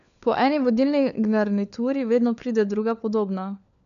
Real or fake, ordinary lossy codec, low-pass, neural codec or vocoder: fake; AAC, 96 kbps; 7.2 kHz; codec, 16 kHz, 4 kbps, FunCodec, trained on LibriTTS, 50 frames a second